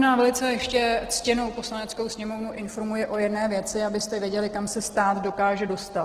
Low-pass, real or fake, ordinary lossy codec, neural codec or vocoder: 14.4 kHz; real; Opus, 16 kbps; none